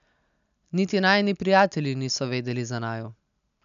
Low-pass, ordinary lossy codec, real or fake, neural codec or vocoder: 7.2 kHz; none; real; none